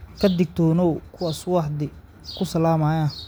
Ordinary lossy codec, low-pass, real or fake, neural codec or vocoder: none; none; real; none